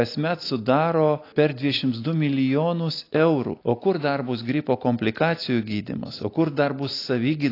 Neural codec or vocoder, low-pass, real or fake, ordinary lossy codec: none; 5.4 kHz; real; AAC, 32 kbps